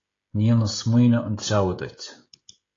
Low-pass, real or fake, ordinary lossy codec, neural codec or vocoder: 7.2 kHz; fake; AAC, 32 kbps; codec, 16 kHz, 16 kbps, FreqCodec, smaller model